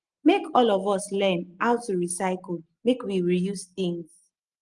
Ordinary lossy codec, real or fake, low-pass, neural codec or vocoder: Opus, 24 kbps; real; 10.8 kHz; none